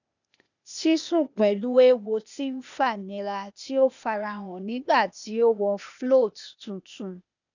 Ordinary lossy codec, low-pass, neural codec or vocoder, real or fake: none; 7.2 kHz; codec, 16 kHz, 0.8 kbps, ZipCodec; fake